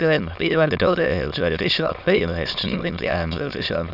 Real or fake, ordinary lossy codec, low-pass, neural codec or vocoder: fake; none; 5.4 kHz; autoencoder, 22.05 kHz, a latent of 192 numbers a frame, VITS, trained on many speakers